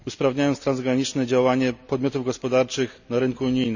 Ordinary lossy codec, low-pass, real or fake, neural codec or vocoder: none; 7.2 kHz; real; none